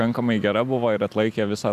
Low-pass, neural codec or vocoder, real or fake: 14.4 kHz; none; real